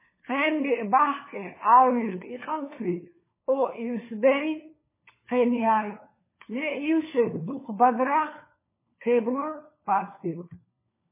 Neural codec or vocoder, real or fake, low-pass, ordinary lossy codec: codec, 16 kHz, 4 kbps, FunCodec, trained on LibriTTS, 50 frames a second; fake; 3.6 kHz; MP3, 16 kbps